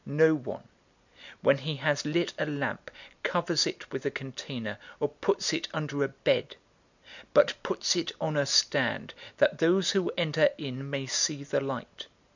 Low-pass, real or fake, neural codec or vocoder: 7.2 kHz; real; none